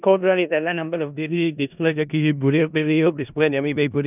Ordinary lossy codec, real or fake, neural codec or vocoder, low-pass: none; fake; codec, 16 kHz in and 24 kHz out, 0.4 kbps, LongCat-Audio-Codec, four codebook decoder; 3.6 kHz